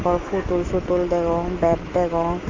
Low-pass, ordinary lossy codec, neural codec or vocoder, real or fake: 7.2 kHz; Opus, 24 kbps; autoencoder, 48 kHz, 128 numbers a frame, DAC-VAE, trained on Japanese speech; fake